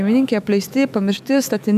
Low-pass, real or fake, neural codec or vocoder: 14.4 kHz; fake; autoencoder, 48 kHz, 128 numbers a frame, DAC-VAE, trained on Japanese speech